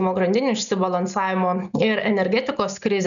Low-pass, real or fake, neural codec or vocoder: 7.2 kHz; real; none